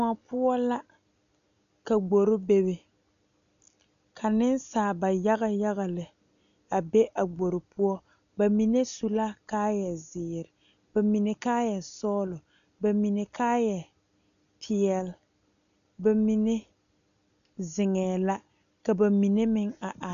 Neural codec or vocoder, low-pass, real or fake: none; 7.2 kHz; real